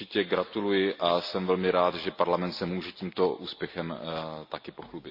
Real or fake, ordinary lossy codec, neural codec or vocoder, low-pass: real; MP3, 32 kbps; none; 5.4 kHz